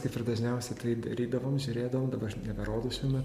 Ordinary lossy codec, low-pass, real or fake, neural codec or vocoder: MP3, 64 kbps; 14.4 kHz; real; none